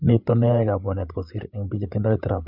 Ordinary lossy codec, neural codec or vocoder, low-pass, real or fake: none; codec, 16 kHz, 8 kbps, FreqCodec, larger model; 5.4 kHz; fake